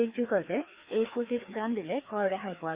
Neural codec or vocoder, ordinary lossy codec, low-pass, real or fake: codec, 16 kHz, 2 kbps, FreqCodec, larger model; Opus, 64 kbps; 3.6 kHz; fake